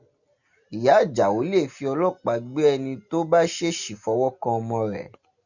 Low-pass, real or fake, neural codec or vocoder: 7.2 kHz; real; none